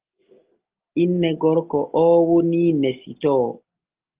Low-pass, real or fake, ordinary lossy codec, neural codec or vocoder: 3.6 kHz; real; Opus, 32 kbps; none